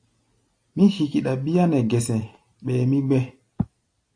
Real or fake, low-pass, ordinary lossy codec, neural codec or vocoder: real; 9.9 kHz; AAC, 48 kbps; none